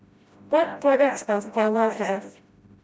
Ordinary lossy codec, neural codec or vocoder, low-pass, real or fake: none; codec, 16 kHz, 0.5 kbps, FreqCodec, smaller model; none; fake